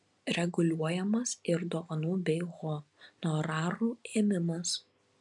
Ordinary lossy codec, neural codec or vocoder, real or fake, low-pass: MP3, 96 kbps; none; real; 10.8 kHz